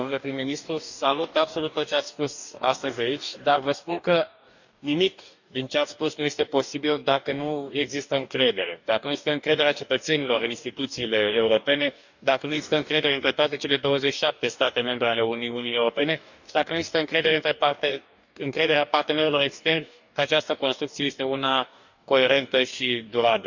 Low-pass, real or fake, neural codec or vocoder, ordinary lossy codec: 7.2 kHz; fake; codec, 44.1 kHz, 2.6 kbps, DAC; none